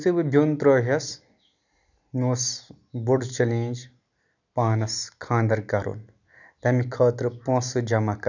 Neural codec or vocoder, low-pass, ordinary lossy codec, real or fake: none; 7.2 kHz; none; real